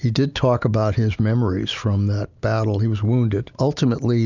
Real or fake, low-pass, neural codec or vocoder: real; 7.2 kHz; none